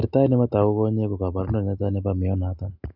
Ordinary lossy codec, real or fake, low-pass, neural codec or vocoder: none; real; 5.4 kHz; none